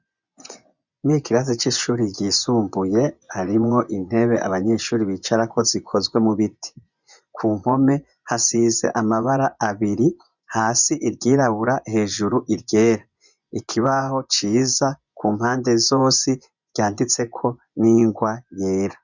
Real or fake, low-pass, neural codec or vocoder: fake; 7.2 kHz; vocoder, 24 kHz, 100 mel bands, Vocos